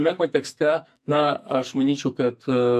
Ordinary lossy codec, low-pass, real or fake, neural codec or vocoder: AAC, 96 kbps; 14.4 kHz; fake; codec, 44.1 kHz, 2.6 kbps, SNAC